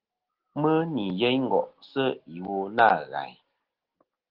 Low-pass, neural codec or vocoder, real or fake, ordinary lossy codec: 5.4 kHz; none; real; Opus, 16 kbps